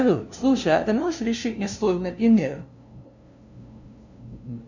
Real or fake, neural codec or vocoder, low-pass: fake; codec, 16 kHz, 0.5 kbps, FunCodec, trained on LibriTTS, 25 frames a second; 7.2 kHz